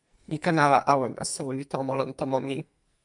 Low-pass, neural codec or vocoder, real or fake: 10.8 kHz; codec, 44.1 kHz, 2.6 kbps, SNAC; fake